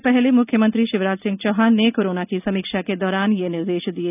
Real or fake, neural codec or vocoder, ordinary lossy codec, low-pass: real; none; none; 3.6 kHz